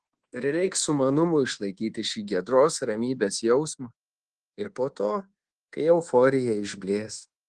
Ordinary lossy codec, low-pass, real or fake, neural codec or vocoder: Opus, 16 kbps; 10.8 kHz; fake; codec, 24 kHz, 1.2 kbps, DualCodec